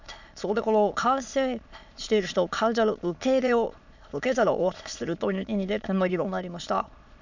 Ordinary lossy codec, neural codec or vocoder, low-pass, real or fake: none; autoencoder, 22.05 kHz, a latent of 192 numbers a frame, VITS, trained on many speakers; 7.2 kHz; fake